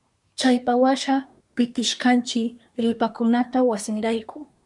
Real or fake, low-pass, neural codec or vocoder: fake; 10.8 kHz; codec, 24 kHz, 1 kbps, SNAC